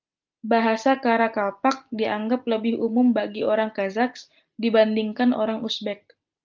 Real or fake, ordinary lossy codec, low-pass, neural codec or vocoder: real; Opus, 24 kbps; 7.2 kHz; none